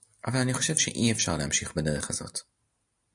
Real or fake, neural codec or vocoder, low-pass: real; none; 10.8 kHz